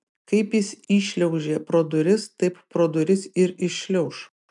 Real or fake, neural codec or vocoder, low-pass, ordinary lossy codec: real; none; 10.8 kHz; MP3, 96 kbps